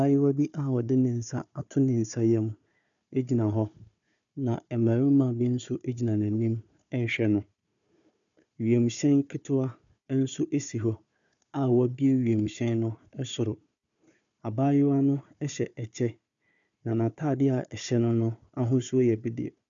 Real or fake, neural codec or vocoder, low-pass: fake; codec, 16 kHz, 4 kbps, FunCodec, trained on Chinese and English, 50 frames a second; 7.2 kHz